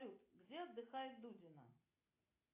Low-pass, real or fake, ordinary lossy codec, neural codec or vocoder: 3.6 kHz; real; AAC, 32 kbps; none